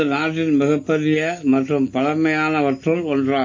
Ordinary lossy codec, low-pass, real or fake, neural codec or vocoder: MP3, 32 kbps; 7.2 kHz; real; none